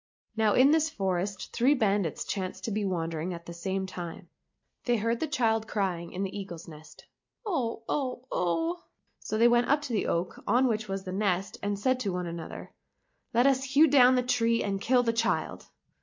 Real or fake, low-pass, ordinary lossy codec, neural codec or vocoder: real; 7.2 kHz; MP3, 48 kbps; none